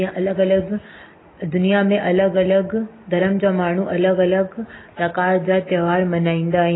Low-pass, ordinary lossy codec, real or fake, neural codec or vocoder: 7.2 kHz; AAC, 16 kbps; real; none